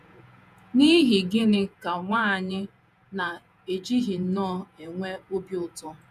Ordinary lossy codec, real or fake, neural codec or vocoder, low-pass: none; fake; vocoder, 48 kHz, 128 mel bands, Vocos; 14.4 kHz